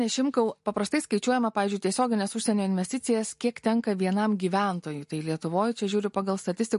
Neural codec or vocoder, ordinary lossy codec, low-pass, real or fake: none; MP3, 48 kbps; 14.4 kHz; real